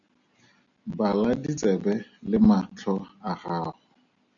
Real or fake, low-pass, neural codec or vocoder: real; 7.2 kHz; none